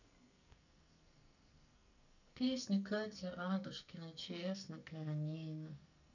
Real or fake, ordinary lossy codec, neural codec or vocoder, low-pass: fake; none; codec, 44.1 kHz, 2.6 kbps, SNAC; 7.2 kHz